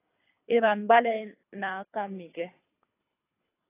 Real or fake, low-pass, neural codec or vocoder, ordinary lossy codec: fake; 3.6 kHz; codec, 24 kHz, 3 kbps, HILCodec; AAC, 16 kbps